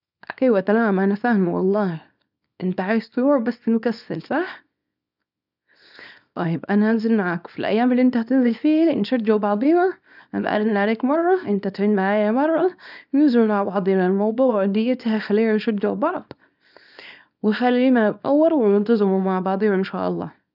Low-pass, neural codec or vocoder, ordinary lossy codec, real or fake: 5.4 kHz; codec, 24 kHz, 0.9 kbps, WavTokenizer, small release; none; fake